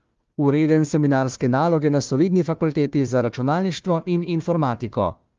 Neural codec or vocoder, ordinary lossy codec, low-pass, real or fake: codec, 16 kHz, 1 kbps, FunCodec, trained on Chinese and English, 50 frames a second; Opus, 32 kbps; 7.2 kHz; fake